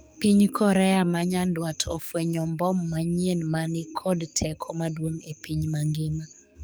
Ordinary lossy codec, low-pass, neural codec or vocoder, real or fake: none; none; codec, 44.1 kHz, 7.8 kbps, DAC; fake